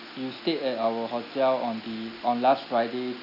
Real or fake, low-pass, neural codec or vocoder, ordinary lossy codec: real; 5.4 kHz; none; none